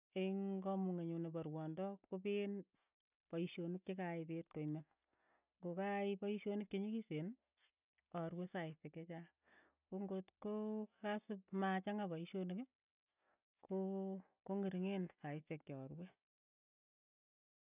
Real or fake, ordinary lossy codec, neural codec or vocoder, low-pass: real; none; none; 3.6 kHz